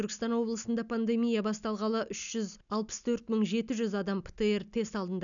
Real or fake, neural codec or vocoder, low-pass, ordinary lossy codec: real; none; 7.2 kHz; none